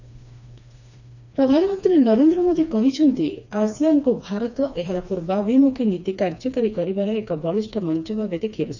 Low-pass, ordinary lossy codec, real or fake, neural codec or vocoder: 7.2 kHz; none; fake; codec, 16 kHz, 2 kbps, FreqCodec, smaller model